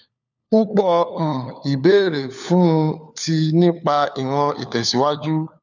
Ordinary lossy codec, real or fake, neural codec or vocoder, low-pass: none; fake; codec, 16 kHz, 4 kbps, FunCodec, trained on LibriTTS, 50 frames a second; 7.2 kHz